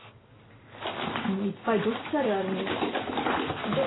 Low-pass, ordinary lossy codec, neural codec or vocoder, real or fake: 7.2 kHz; AAC, 16 kbps; none; real